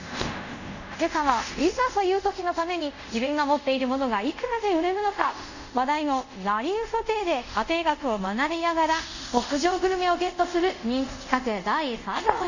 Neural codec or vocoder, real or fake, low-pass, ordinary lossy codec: codec, 24 kHz, 0.5 kbps, DualCodec; fake; 7.2 kHz; none